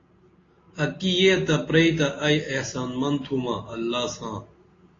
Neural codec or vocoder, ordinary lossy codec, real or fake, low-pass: none; AAC, 32 kbps; real; 7.2 kHz